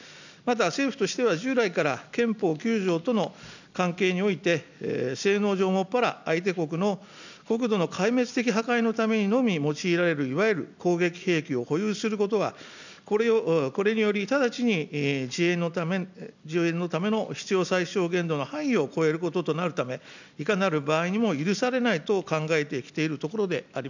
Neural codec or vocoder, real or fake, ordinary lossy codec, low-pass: none; real; none; 7.2 kHz